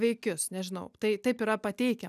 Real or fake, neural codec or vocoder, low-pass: real; none; 14.4 kHz